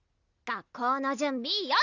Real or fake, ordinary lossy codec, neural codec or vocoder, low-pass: real; none; none; 7.2 kHz